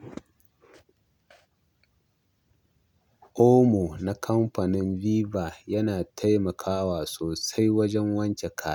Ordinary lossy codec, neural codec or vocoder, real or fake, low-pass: none; none; real; 19.8 kHz